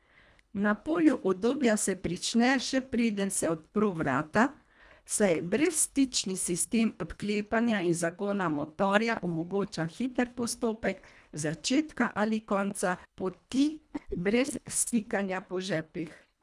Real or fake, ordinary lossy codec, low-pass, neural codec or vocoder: fake; none; none; codec, 24 kHz, 1.5 kbps, HILCodec